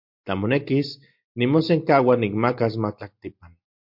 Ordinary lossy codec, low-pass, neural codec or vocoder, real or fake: MP3, 48 kbps; 5.4 kHz; none; real